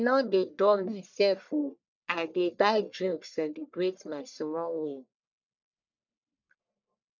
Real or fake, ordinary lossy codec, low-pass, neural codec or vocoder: fake; none; 7.2 kHz; codec, 44.1 kHz, 1.7 kbps, Pupu-Codec